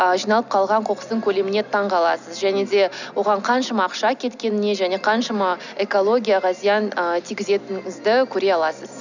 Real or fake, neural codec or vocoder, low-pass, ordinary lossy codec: real; none; 7.2 kHz; none